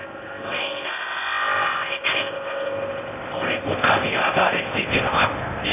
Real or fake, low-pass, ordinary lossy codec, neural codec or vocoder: fake; 3.6 kHz; none; codec, 16 kHz in and 24 kHz out, 0.6 kbps, FocalCodec, streaming, 4096 codes